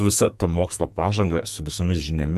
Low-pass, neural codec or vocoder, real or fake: 14.4 kHz; codec, 44.1 kHz, 2.6 kbps, SNAC; fake